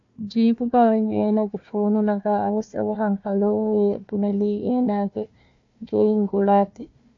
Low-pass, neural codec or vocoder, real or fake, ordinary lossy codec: 7.2 kHz; codec, 16 kHz, 1 kbps, FunCodec, trained on Chinese and English, 50 frames a second; fake; AAC, 48 kbps